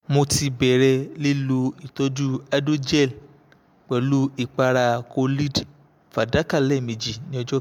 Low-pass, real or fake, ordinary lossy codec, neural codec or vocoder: 19.8 kHz; real; MP3, 96 kbps; none